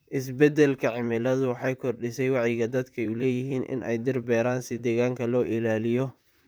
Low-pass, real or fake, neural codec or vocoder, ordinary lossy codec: none; fake; vocoder, 44.1 kHz, 128 mel bands, Pupu-Vocoder; none